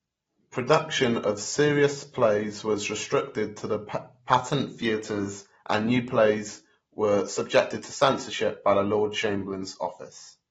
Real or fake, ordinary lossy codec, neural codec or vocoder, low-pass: real; AAC, 24 kbps; none; 19.8 kHz